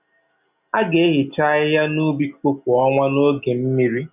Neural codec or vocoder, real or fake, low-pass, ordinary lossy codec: none; real; 3.6 kHz; none